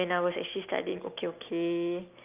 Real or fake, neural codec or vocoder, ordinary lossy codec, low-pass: real; none; Opus, 24 kbps; 3.6 kHz